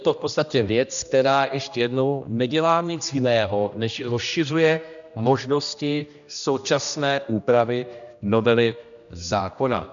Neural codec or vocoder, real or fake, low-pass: codec, 16 kHz, 1 kbps, X-Codec, HuBERT features, trained on general audio; fake; 7.2 kHz